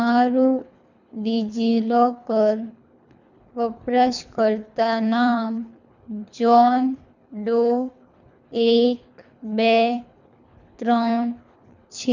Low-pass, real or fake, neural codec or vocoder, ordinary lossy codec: 7.2 kHz; fake; codec, 24 kHz, 3 kbps, HILCodec; none